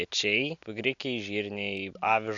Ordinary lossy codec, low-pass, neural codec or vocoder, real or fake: AAC, 64 kbps; 7.2 kHz; none; real